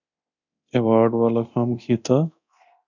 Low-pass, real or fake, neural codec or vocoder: 7.2 kHz; fake; codec, 24 kHz, 0.9 kbps, DualCodec